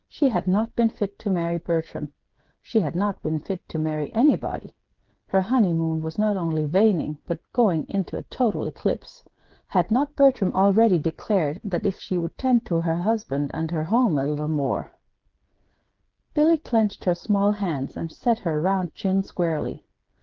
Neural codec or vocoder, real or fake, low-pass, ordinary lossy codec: codec, 16 kHz, 8 kbps, FreqCodec, smaller model; fake; 7.2 kHz; Opus, 16 kbps